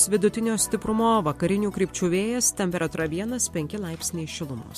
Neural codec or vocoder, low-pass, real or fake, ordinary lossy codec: none; 14.4 kHz; real; MP3, 64 kbps